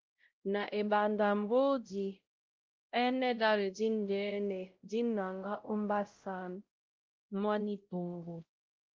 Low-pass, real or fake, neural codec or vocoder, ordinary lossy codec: 7.2 kHz; fake; codec, 16 kHz, 0.5 kbps, X-Codec, WavLM features, trained on Multilingual LibriSpeech; Opus, 32 kbps